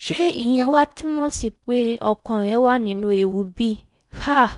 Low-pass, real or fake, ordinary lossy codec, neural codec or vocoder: 10.8 kHz; fake; none; codec, 16 kHz in and 24 kHz out, 0.6 kbps, FocalCodec, streaming, 4096 codes